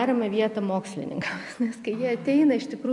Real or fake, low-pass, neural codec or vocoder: fake; 10.8 kHz; vocoder, 44.1 kHz, 128 mel bands every 256 samples, BigVGAN v2